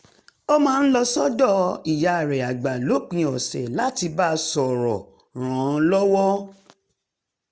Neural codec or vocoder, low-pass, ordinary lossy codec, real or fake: none; none; none; real